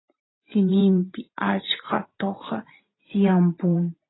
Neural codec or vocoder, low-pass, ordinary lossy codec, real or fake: vocoder, 44.1 kHz, 128 mel bands every 512 samples, BigVGAN v2; 7.2 kHz; AAC, 16 kbps; fake